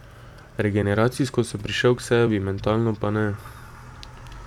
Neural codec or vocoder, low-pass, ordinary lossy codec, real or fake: vocoder, 44.1 kHz, 128 mel bands every 256 samples, BigVGAN v2; 19.8 kHz; none; fake